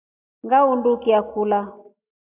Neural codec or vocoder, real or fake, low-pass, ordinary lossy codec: none; real; 3.6 kHz; AAC, 32 kbps